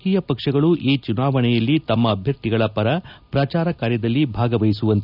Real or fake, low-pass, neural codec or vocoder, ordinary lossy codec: real; 5.4 kHz; none; none